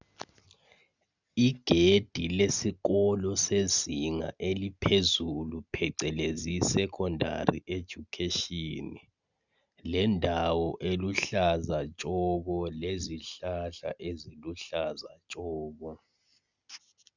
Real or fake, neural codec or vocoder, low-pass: real; none; 7.2 kHz